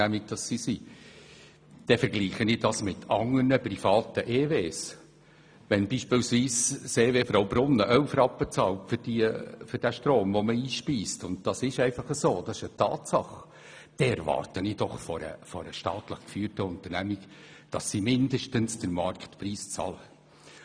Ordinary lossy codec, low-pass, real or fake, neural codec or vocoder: none; 9.9 kHz; real; none